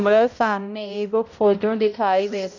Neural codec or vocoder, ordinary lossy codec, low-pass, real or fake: codec, 16 kHz, 0.5 kbps, X-Codec, HuBERT features, trained on balanced general audio; none; 7.2 kHz; fake